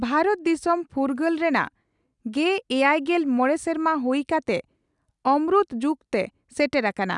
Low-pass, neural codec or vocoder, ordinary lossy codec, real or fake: 10.8 kHz; none; none; real